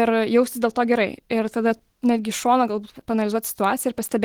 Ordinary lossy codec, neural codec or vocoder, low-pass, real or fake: Opus, 16 kbps; none; 19.8 kHz; real